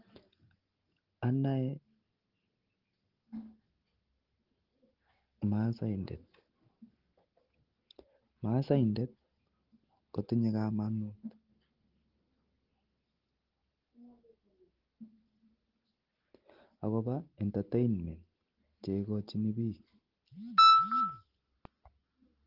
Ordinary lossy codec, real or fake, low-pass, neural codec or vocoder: Opus, 16 kbps; real; 5.4 kHz; none